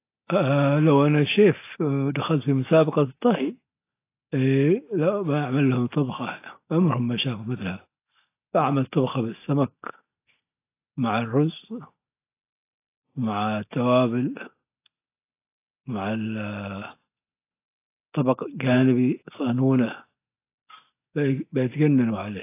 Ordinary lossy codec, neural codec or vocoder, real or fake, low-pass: AAC, 24 kbps; none; real; 3.6 kHz